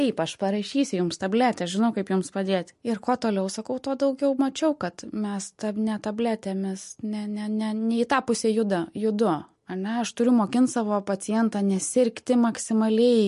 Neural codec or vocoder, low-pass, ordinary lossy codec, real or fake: none; 14.4 kHz; MP3, 48 kbps; real